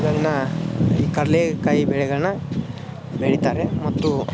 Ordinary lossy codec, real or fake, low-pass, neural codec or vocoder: none; real; none; none